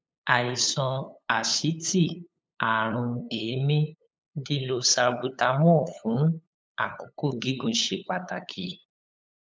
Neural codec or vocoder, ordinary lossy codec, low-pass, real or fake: codec, 16 kHz, 8 kbps, FunCodec, trained on LibriTTS, 25 frames a second; none; none; fake